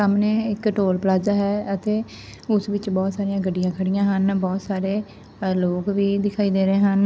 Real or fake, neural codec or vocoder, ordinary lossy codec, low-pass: real; none; none; none